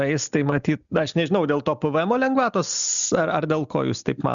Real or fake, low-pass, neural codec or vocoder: real; 7.2 kHz; none